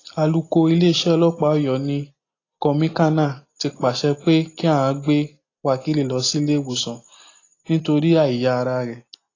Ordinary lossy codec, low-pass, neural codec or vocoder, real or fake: AAC, 32 kbps; 7.2 kHz; none; real